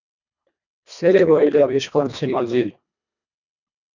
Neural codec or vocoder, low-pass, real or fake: codec, 24 kHz, 1.5 kbps, HILCodec; 7.2 kHz; fake